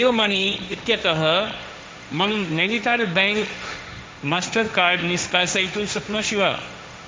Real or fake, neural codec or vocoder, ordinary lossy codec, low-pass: fake; codec, 16 kHz, 1.1 kbps, Voila-Tokenizer; none; none